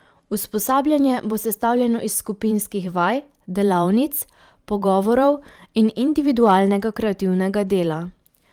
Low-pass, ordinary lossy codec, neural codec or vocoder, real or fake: 19.8 kHz; Opus, 32 kbps; vocoder, 44.1 kHz, 128 mel bands every 512 samples, BigVGAN v2; fake